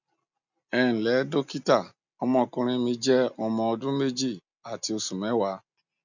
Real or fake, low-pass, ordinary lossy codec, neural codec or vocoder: real; 7.2 kHz; none; none